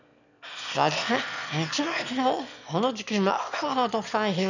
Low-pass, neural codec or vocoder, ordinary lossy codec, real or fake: 7.2 kHz; autoencoder, 22.05 kHz, a latent of 192 numbers a frame, VITS, trained on one speaker; none; fake